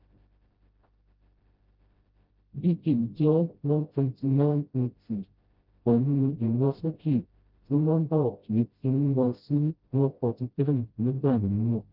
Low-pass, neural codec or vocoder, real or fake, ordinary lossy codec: 5.4 kHz; codec, 16 kHz, 0.5 kbps, FreqCodec, smaller model; fake; Opus, 24 kbps